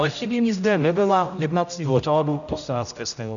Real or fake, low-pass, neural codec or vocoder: fake; 7.2 kHz; codec, 16 kHz, 0.5 kbps, X-Codec, HuBERT features, trained on general audio